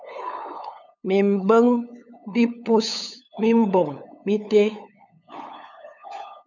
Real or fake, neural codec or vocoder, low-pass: fake; codec, 16 kHz, 8 kbps, FunCodec, trained on LibriTTS, 25 frames a second; 7.2 kHz